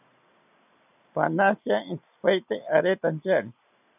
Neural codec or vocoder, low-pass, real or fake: none; 3.6 kHz; real